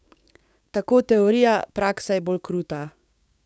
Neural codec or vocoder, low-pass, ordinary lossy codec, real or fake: codec, 16 kHz, 6 kbps, DAC; none; none; fake